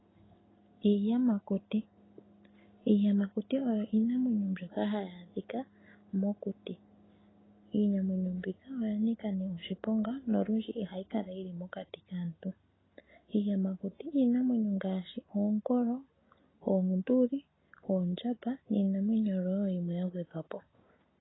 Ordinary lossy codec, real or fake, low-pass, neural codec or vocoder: AAC, 16 kbps; real; 7.2 kHz; none